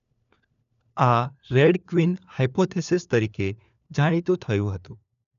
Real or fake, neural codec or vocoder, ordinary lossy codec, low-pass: fake; codec, 16 kHz, 4 kbps, FunCodec, trained on LibriTTS, 50 frames a second; none; 7.2 kHz